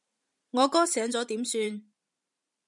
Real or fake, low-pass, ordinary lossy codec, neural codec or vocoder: fake; 10.8 kHz; MP3, 96 kbps; vocoder, 44.1 kHz, 128 mel bands every 512 samples, BigVGAN v2